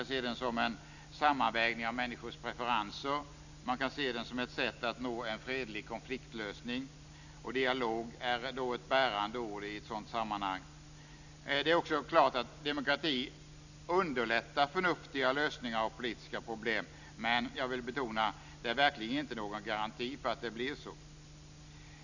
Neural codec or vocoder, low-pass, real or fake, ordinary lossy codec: none; 7.2 kHz; real; none